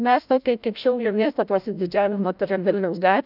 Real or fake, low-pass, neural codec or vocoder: fake; 5.4 kHz; codec, 16 kHz, 0.5 kbps, FreqCodec, larger model